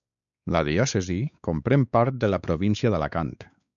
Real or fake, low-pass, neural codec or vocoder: fake; 7.2 kHz; codec, 16 kHz, 4 kbps, X-Codec, WavLM features, trained on Multilingual LibriSpeech